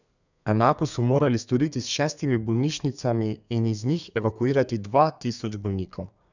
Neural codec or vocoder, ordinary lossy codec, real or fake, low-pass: codec, 32 kHz, 1.9 kbps, SNAC; none; fake; 7.2 kHz